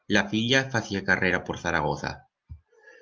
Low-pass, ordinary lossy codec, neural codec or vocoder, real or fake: 7.2 kHz; Opus, 24 kbps; none; real